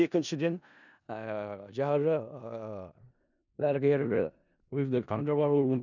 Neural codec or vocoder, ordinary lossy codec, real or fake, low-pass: codec, 16 kHz in and 24 kHz out, 0.4 kbps, LongCat-Audio-Codec, four codebook decoder; none; fake; 7.2 kHz